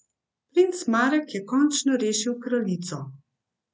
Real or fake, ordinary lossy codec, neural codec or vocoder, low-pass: real; none; none; none